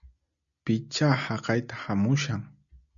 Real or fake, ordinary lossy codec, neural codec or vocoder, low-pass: real; MP3, 96 kbps; none; 7.2 kHz